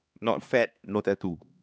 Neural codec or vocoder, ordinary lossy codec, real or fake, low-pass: codec, 16 kHz, 2 kbps, X-Codec, HuBERT features, trained on LibriSpeech; none; fake; none